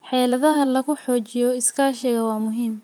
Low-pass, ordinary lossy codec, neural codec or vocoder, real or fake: none; none; vocoder, 44.1 kHz, 128 mel bands, Pupu-Vocoder; fake